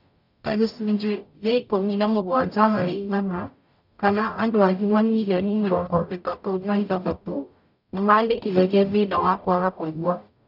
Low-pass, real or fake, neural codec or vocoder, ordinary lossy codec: 5.4 kHz; fake; codec, 44.1 kHz, 0.9 kbps, DAC; none